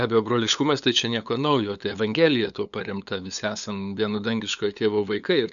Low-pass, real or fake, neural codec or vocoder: 7.2 kHz; fake; codec, 16 kHz, 8 kbps, FunCodec, trained on LibriTTS, 25 frames a second